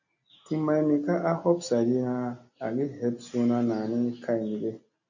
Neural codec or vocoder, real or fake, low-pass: none; real; 7.2 kHz